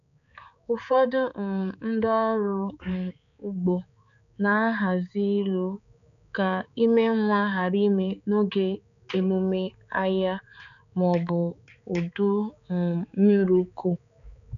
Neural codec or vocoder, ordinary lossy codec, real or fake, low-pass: codec, 16 kHz, 4 kbps, X-Codec, HuBERT features, trained on balanced general audio; none; fake; 7.2 kHz